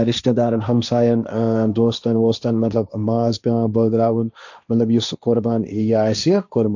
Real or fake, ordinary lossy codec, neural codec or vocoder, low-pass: fake; none; codec, 16 kHz, 1.1 kbps, Voila-Tokenizer; 7.2 kHz